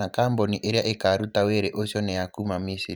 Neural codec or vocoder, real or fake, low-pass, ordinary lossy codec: vocoder, 44.1 kHz, 128 mel bands every 512 samples, BigVGAN v2; fake; none; none